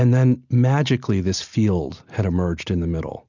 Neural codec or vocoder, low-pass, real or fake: none; 7.2 kHz; real